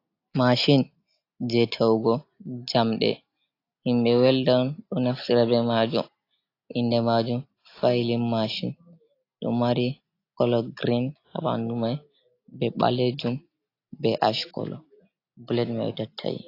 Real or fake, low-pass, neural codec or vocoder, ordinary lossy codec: real; 5.4 kHz; none; AAC, 32 kbps